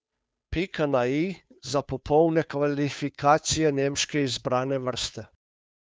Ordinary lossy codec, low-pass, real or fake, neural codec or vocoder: none; none; fake; codec, 16 kHz, 2 kbps, FunCodec, trained on Chinese and English, 25 frames a second